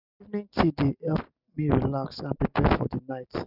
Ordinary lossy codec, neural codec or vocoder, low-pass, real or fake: none; none; 5.4 kHz; real